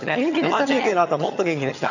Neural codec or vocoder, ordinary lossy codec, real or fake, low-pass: vocoder, 22.05 kHz, 80 mel bands, HiFi-GAN; none; fake; 7.2 kHz